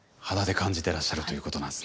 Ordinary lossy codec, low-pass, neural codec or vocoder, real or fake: none; none; none; real